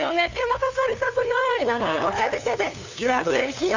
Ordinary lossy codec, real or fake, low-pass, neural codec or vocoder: none; fake; 7.2 kHz; codec, 16 kHz, 2 kbps, FunCodec, trained on LibriTTS, 25 frames a second